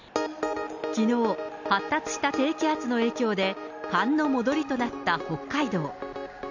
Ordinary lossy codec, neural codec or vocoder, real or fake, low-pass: none; none; real; 7.2 kHz